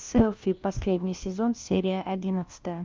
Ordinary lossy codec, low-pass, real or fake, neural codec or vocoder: Opus, 24 kbps; 7.2 kHz; fake; codec, 16 kHz, 1 kbps, FunCodec, trained on LibriTTS, 50 frames a second